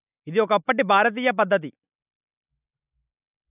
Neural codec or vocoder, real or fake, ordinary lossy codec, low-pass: none; real; AAC, 32 kbps; 3.6 kHz